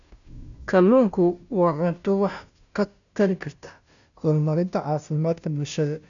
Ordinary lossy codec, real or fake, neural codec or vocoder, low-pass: none; fake; codec, 16 kHz, 0.5 kbps, FunCodec, trained on Chinese and English, 25 frames a second; 7.2 kHz